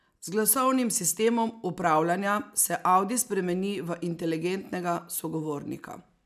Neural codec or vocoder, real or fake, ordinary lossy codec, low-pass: none; real; none; 14.4 kHz